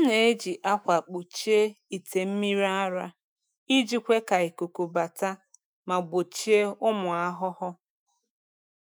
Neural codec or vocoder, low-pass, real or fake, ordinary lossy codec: autoencoder, 48 kHz, 128 numbers a frame, DAC-VAE, trained on Japanese speech; none; fake; none